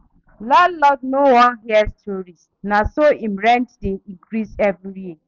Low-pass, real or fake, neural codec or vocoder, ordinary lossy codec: 7.2 kHz; real; none; none